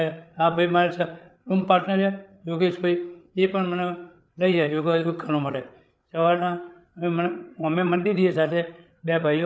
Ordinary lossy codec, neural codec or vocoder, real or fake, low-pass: none; codec, 16 kHz, 8 kbps, FreqCodec, larger model; fake; none